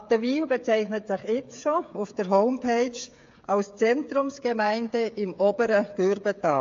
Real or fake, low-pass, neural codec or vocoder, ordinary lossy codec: fake; 7.2 kHz; codec, 16 kHz, 8 kbps, FreqCodec, smaller model; AAC, 48 kbps